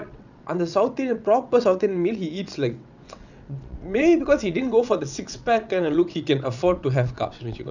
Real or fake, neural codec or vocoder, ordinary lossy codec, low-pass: fake; vocoder, 22.05 kHz, 80 mel bands, WaveNeXt; none; 7.2 kHz